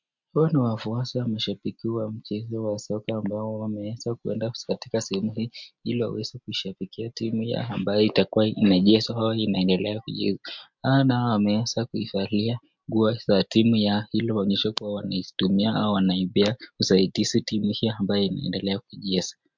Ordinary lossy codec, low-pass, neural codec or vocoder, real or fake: MP3, 64 kbps; 7.2 kHz; none; real